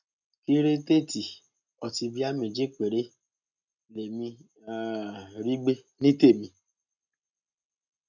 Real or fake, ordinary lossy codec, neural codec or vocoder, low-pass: real; none; none; 7.2 kHz